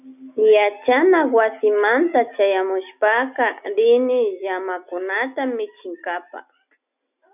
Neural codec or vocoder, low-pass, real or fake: none; 3.6 kHz; real